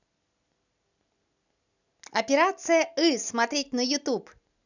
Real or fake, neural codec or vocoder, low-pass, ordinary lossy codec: real; none; 7.2 kHz; none